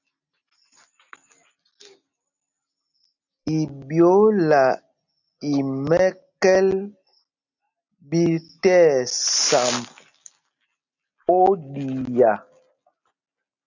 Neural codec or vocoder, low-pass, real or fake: none; 7.2 kHz; real